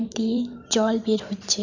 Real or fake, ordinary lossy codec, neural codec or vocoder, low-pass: fake; none; vocoder, 44.1 kHz, 128 mel bands every 512 samples, BigVGAN v2; 7.2 kHz